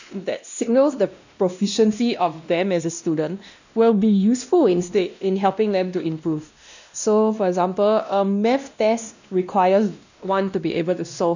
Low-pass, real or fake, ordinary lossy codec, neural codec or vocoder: 7.2 kHz; fake; none; codec, 16 kHz, 1 kbps, X-Codec, WavLM features, trained on Multilingual LibriSpeech